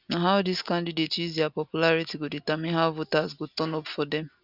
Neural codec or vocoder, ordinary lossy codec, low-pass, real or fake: none; none; 5.4 kHz; real